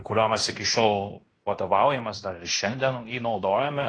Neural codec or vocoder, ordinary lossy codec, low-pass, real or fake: codec, 16 kHz in and 24 kHz out, 0.9 kbps, LongCat-Audio-Codec, fine tuned four codebook decoder; AAC, 32 kbps; 9.9 kHz; fake